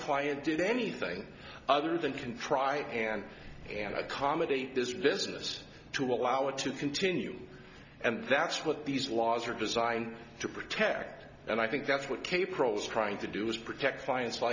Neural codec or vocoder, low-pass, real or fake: none; 7.2 kHz; real